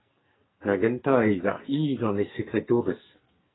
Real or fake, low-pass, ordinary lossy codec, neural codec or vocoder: fake; 7.2 kHz; AAC, 16 kbps; codec, 44.1 kHz, 2.6 kbps, SNAC